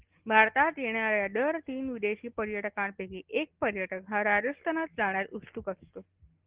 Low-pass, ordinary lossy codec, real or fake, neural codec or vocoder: 3.6 kHz; Opus, 16 kbps; real; none